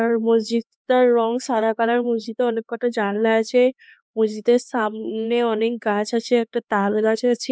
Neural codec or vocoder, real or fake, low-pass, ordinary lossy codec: codec, 16 kHz, 2 kbps, X-Codec, HuBERT features, trained on LibriSpeech; fake; none; none